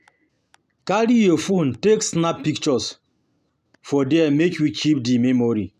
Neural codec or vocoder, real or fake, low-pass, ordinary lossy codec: none; real; 14.4 kHz; none